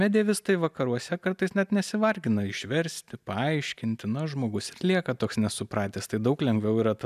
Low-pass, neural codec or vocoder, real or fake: 14.4 kHz; none; real